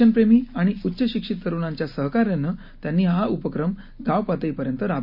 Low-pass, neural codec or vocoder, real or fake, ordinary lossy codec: 5.4 kHz; none; real; none